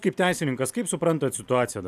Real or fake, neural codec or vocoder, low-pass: real; none; 14.4 kHz